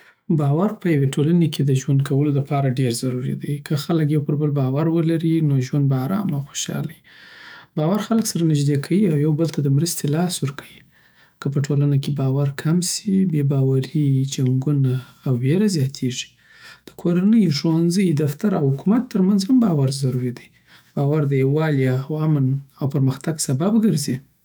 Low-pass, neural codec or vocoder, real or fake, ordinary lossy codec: none; autoencoder, 48 kHz, 128 numbers a frame, DAC-VAE, trained on Japanese speech; fake; none